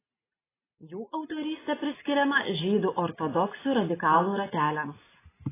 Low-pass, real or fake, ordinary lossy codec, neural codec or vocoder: 3.6 kHz; real; AAC, 16 kbps; none